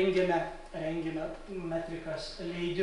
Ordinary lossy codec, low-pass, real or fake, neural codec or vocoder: MP3, 96 kbps; 10.8 kHz; real; none